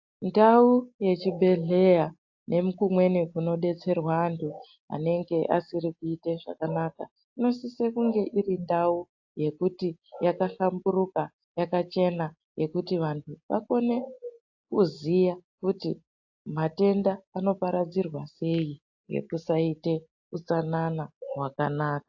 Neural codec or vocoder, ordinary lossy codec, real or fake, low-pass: none; AAC, 48 kbps; real; 7.2 kHz